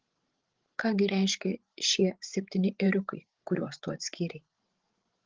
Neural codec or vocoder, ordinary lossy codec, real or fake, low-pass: codec, 16 kHz, 16 kbps, FreqCodec, larger model; Opus, 16 kbps; fake; 7.2 kHz